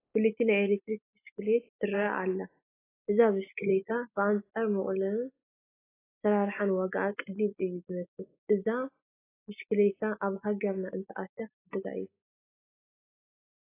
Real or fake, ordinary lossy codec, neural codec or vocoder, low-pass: real; AAC, 16 kbps; none; 3.6 kHz